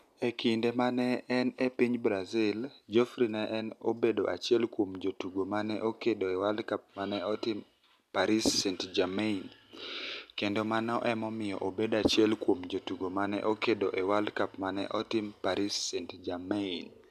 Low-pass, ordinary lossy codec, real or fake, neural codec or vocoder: 14.4 kHz; none; real; none